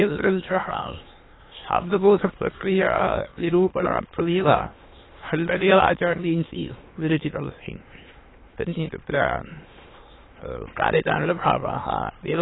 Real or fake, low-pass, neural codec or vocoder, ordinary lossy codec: fake; 7.2 kHz; autoencoder, 22.05 kHz, a latent of 192 numbers a frame, VITS, trained on many speakers; AAC, 16 kbps